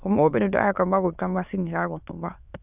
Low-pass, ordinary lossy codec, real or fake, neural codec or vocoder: 3.6 kHz; none; fake; autoencoder, 22.05 kHz, a latent of 192 numbers a frame, VITS, trained on many speakers